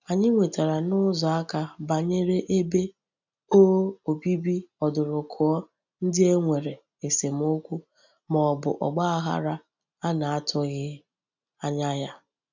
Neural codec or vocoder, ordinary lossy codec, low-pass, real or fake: none; none; 7.2 kHz; real